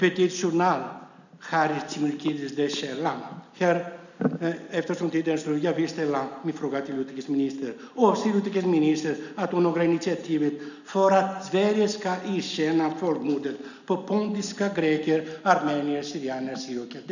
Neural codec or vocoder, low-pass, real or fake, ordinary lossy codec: none; 7.2 kHz; real; none